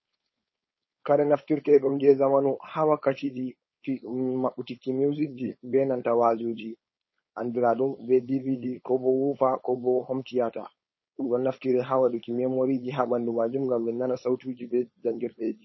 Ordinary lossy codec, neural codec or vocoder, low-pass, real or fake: MP3, 24 kbps; codec, 16 kHz, 4.8 kbps, FACodec; 7.2 kHz; fake